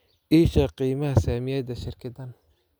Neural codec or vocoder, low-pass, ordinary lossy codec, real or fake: none; none; none; real